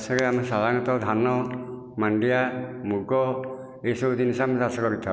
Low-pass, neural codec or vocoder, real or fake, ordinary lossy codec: none; none; real; none